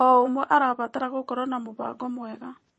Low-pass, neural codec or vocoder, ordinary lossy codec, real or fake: 9.9 kHz; vocoder, 22.05 kHz, 80 mel bands, Vocos; MP3, 32 kbps; fake